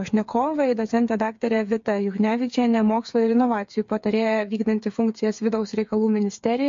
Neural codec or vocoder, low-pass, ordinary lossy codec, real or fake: codec, 16 kHz, 8 kbps, FreqCodec, smaller model; 7.2 kHz; MP3, 48 kbps; fake